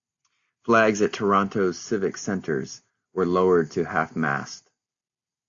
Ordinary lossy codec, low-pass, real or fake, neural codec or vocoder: AAC, 64 kbps; 7.2 kHz; real; none